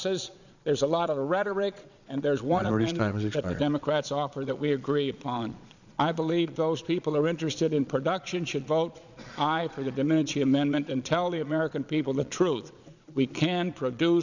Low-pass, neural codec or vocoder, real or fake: 7.2 kHz; vocoder, 22.05 kHz, 80 mel bands, Vocos; fake